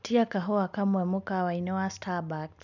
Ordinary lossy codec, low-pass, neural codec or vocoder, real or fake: none; 7.2 kHz; none; real